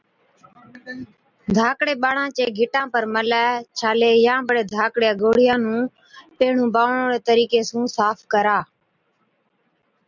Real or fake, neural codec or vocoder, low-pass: real; none; 7.2 kHz